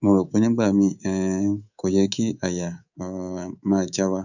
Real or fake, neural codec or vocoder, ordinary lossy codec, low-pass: fake; codec, 24 kHz, 3.1 kbps, DualCodec; none; 7.2 kHz